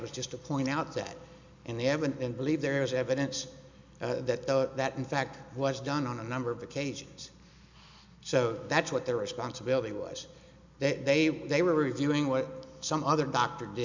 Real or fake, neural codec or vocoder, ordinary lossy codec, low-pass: real; none; MP3, 64 kbps; 7.2 kHz